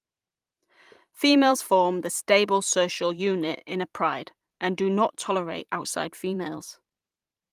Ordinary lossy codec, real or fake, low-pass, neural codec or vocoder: Opus, 32 kbps; fake; 14.4 kHz; vocoder, 44.1 kHz, 128 mel bands every 512 samples, BigVGAN v2